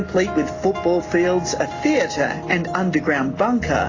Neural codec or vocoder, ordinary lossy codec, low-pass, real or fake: none; AAC, 32 kbps; 7.2 kHz; real